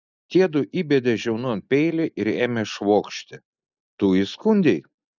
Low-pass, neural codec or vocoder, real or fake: 7.2 kHz; none; real